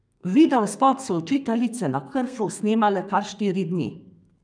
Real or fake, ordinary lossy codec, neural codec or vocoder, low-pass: fake; none; codec, 32 kHz, 1.9 kbps, SNAC; 9.9 kHz